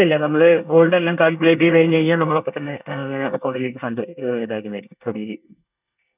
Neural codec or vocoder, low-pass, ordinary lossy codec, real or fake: codec, 24 kHz, 1 kbps, SNAC; 3.6 kHz; none; fake